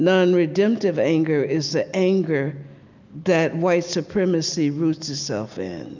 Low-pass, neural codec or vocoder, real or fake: 7.2 kHz; none; real